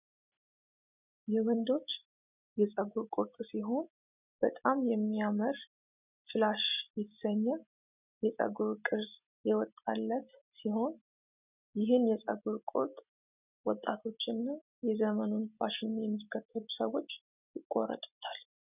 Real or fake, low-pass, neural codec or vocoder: real; 3.6 kHz; none